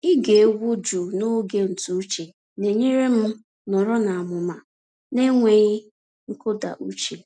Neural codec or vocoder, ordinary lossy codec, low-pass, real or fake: none; none; none; real